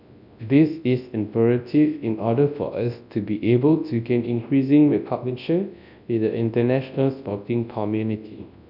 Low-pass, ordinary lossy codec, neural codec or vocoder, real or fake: 5.4 kHz; none; codec, 24 kHz, 0.9 kbps, WavTokenizer, large speech release; fake